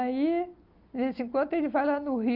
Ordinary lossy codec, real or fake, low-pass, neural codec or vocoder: Opus, 24 kbps; real; 5.4 kHz; none